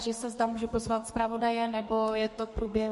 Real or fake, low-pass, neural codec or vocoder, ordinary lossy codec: fake; 14.4 kHz; codec, 32 kHz, 1.9 kbps, SNAC; MP3, 48 kbps